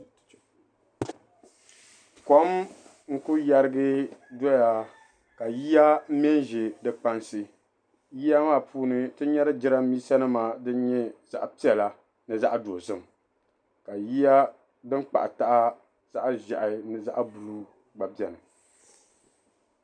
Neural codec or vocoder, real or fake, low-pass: none; real; 9.9 kHz